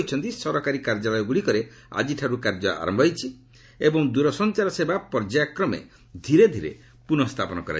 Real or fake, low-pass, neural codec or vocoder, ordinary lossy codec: real; none; none; none